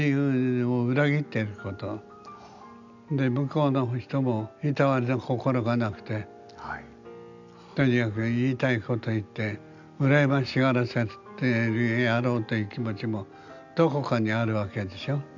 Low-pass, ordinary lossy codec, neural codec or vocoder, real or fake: 7.2 kHz; none; none; real